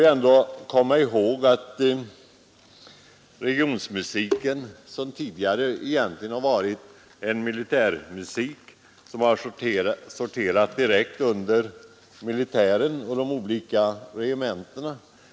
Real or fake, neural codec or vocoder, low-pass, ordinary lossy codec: real; none; none; none